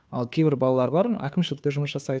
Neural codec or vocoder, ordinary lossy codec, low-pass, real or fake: codec, 16 kHz, 2 kbps, FunCodec, trained on Chinese and English, 25 frames a second; none; none; fake